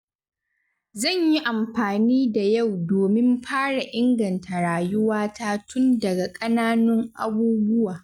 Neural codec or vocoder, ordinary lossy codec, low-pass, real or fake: none; none; 19.8 kHz; real